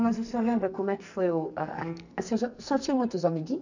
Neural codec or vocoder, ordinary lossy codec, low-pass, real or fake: codec, 44.1 kHz, 2.6 kbps, SNAC; none; 7.2 kHz; fake